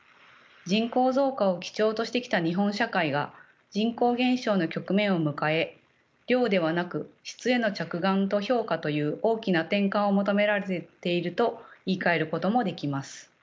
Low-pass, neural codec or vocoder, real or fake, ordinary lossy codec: none; none; real; none